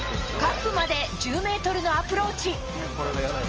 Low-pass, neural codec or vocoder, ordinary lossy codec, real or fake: 7.2 kHz; none; Opus, 24 kbps; real